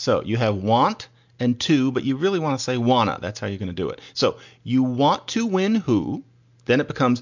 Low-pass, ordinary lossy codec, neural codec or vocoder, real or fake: 7.2 kHz; MP3, 64 kbps; none; real